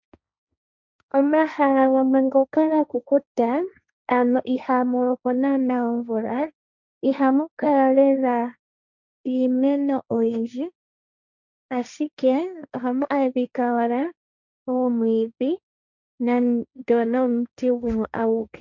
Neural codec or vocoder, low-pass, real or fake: codec, 16 kHz, 1.1 kbps, Voila-Tokenizer; 7.2 kHz; fake